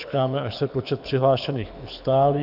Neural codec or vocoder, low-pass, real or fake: codec, 24 kHz, 6 kbps, HILCodec; 5.4 kHz; fake